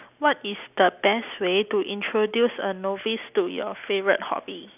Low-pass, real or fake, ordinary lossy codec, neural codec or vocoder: 3.6 kHz; real; none; none